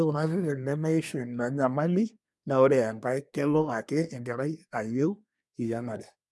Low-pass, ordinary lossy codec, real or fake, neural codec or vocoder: none; none; fake; codec, 24 kHz, 1 kbps, SNAC